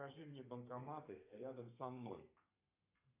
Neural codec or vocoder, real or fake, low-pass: codec, 32 kHz, 1.9 kbps, SNAC; fake; 3.6 kHz